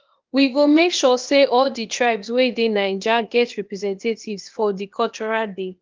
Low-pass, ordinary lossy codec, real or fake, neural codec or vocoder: 7.2 kHz; Opus, 32 kbps; fake; codec, 16 kHz, 0.8 kbps, ZipCodec